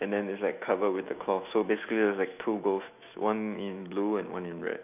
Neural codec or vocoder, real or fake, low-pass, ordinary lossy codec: autoencoder, 48 kHz, 128 numbers a frame, DAC-VAE, trained on Japanese speech; fake; 3.6 kHz; none